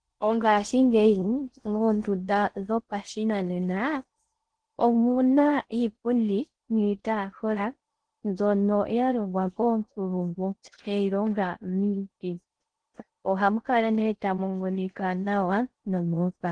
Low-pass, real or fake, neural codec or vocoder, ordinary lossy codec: 9.9 kHz; fake; codec, 16 kHz in and 24 kHz out, 0.6 kbps, FocalCodec, streaming, 4096 codes; Opus, 16 kbps